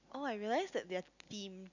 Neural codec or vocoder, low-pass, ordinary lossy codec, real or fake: none; 7.2 kHz; none; real